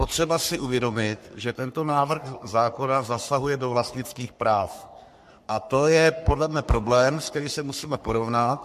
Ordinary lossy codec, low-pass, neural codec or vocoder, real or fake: MP3, 64 kbps; 14.4 kHz; codec, 44.1 kHz, 3.4 kbps, Pupu-Codec; fake